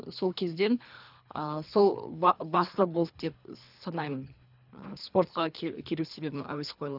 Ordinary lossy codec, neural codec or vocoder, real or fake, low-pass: none; codec, 24 kHz, 3 kbps, HILCodec; fake; 5.4 kHz